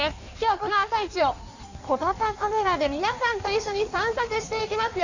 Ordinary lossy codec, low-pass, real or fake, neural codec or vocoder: none; 7.2 kHz; fake; codec, 16 kHz in and 24 kHz out, 1.1 kbps, FireRedTTS-2 codec